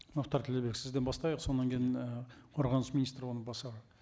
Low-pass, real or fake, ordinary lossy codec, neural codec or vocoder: none; real; none; none